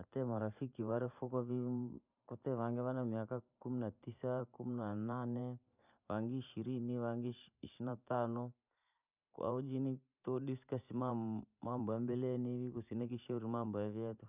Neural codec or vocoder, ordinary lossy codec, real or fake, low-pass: vocoder, 44.1 kHz, 128 mel bands every 512 samples, BigVGAN v2; none; fake; 3.6 kHz